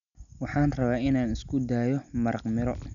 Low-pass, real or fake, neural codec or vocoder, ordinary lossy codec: 7.2 kHz; real; none; none